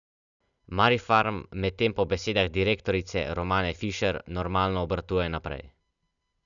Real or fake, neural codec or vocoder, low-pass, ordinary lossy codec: real; none; 7.2 kHz; none